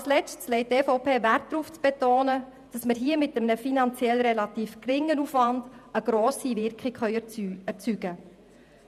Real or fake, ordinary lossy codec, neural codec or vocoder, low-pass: fake; none; vocoder, 48 kHz, 128 mel bands, Vocos; 14.4 kHz